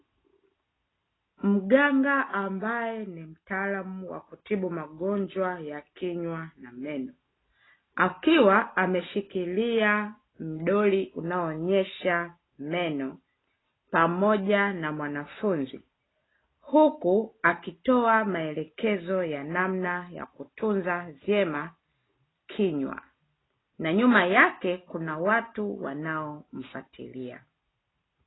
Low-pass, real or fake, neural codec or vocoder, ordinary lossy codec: 7.2 kHz; real; none; AAC, 16 kbps